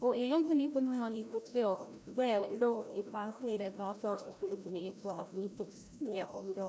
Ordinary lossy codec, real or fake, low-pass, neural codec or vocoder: none; fake; none; codec, 16 kHz, 0.5 kbps, FreqCodec, larger model